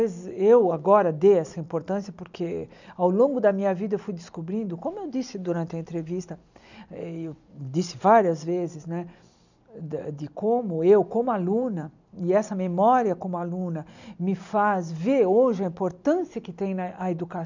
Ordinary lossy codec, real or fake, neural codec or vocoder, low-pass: none; real; none; 7.2 kHz